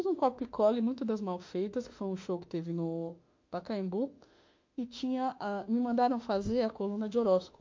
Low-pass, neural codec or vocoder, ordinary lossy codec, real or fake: 7.2 kHz; autoencoder, 48 kHz, 32 numbers a frame, DAC-VAE, trained on Japanese speech; MP3, 48 kbps; fake